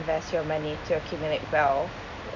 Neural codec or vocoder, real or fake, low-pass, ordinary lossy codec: none; real; 7.2 kHz; none